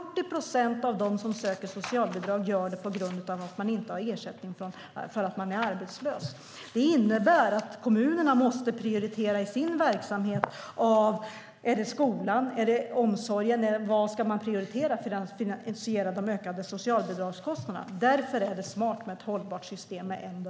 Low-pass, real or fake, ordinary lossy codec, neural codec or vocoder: none; real; none; none